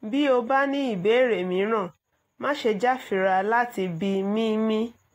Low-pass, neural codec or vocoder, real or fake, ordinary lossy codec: 19.8 kHz; none; real; AAC, 48 kbps